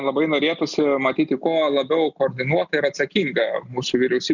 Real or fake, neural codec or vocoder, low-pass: real; none; 7.2 kHz